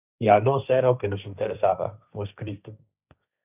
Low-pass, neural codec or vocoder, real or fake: 3.6 kHz; codec, 16 kHz, 1.1 kbps, Voila-Tokenizer; fake